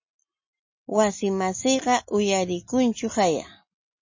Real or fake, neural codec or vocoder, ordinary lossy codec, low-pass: real; none; MP3, 32 kbps; 7.2 kHz